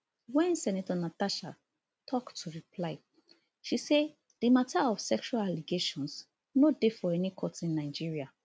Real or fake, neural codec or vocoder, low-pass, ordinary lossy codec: real; none; none; none